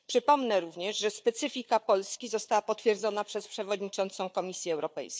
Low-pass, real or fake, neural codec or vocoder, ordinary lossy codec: none; fake; codec, 16 kHz, 4 kbps, FunCodec, trained on Chinese and English, 50 frames a second; none